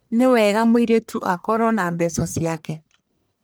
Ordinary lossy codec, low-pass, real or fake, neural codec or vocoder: none; none; fake; codec, 44.1 kHz, 1.7 kbps, Pupu-Codec